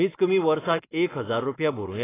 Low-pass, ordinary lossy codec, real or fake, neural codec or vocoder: 3.6 kHz; AAC, 16 kbps; real; none